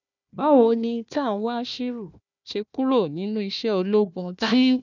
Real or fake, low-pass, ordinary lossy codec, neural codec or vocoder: fake; 7.2 kHz; none; codec, 16 kHz, 1 kbps, FunCodec, trained on Chinese and English, 50 frames a second